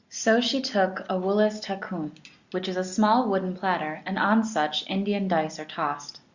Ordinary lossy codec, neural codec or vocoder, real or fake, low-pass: Opus, 64 kbps; none; real; 7.2 kHz